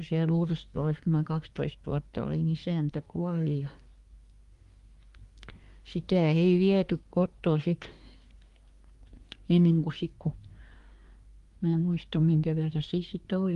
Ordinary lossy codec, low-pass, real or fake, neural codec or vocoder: Opus, 32 kbps; 10.8 kHz; fake; codec, 24 kHz, 1 kbps, SNAC